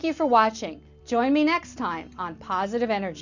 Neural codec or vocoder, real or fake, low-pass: none; real; 7.2 kHz